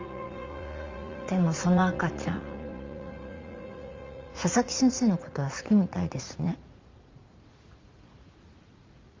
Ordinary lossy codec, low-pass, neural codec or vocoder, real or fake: Opus, 32 kbps; 7.2 kHz; vocoder, 44.1 kHz, 80 mel bands, Vocos; fake